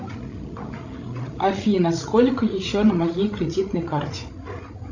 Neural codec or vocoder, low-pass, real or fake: codec, 16 kHz, 16 kbps, FreqCodec, larger model; 7.2 kHz; fake